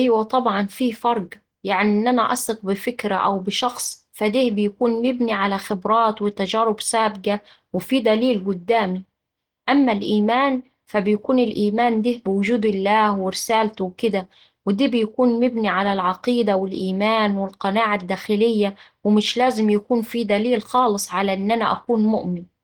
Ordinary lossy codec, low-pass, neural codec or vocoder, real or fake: Opus, 16 kbps; 19.8 kHz; none; real